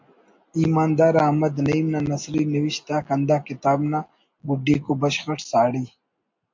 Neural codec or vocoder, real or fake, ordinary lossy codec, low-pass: none; real; MP3, 48 kbps; 7.2 kHz